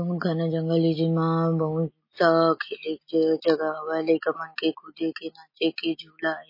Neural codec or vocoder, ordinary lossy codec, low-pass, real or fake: none; MP3, 24 kbps; 5.4 kHz; real